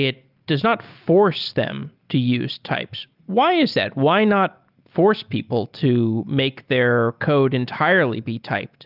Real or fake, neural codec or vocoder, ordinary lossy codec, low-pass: real; none; Opus, 24 kbps; 5.4 kHz